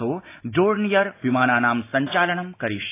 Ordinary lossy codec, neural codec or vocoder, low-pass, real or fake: AAC, 24 kbps; none; 3.6 kHz; real